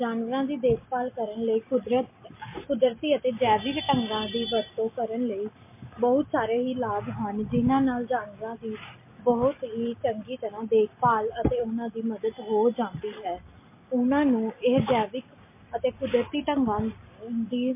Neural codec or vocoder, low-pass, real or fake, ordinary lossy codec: none; 3.6 kHz; real; MP3, 24 kbps